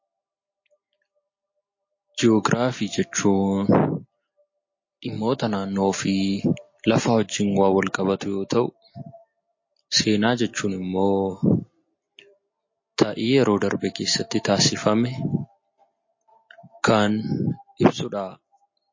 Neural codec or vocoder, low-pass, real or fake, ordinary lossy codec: none; 7.2 kHz; real; MP3, 32 kbps